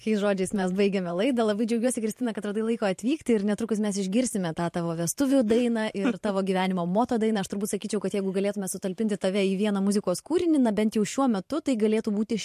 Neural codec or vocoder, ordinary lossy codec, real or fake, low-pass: vocoder, 44.1 kHz, 128 mel bands every 512 samples, BigVGAN v2; MP3, 64 kbps; fake; 14.4 kHz